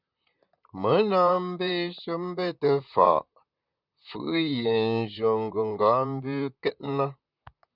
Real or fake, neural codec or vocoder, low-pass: fake; vocoder, 44.1 kHz, 128 mel bands, Pupu-Vocoder; 5.4 kHz